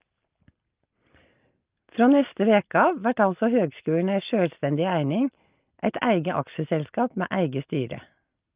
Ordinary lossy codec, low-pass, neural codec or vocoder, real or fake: Opus, 24 kbps; 3.6 kHz; none; real